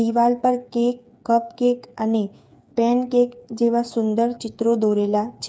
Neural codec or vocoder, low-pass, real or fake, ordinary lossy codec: codec, 16 kHz, 8 kbps, FreqCodec, smaller model; none; fake; none